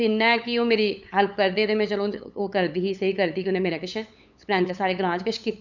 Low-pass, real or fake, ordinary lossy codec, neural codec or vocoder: 7.2 kHz; fake; none; codec, 16 kHz, 8 kbps, FunCodec, trained on LibriTTS, 25 frames a second